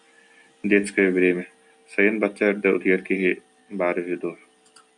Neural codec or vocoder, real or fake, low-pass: none; real; 10.8 kHz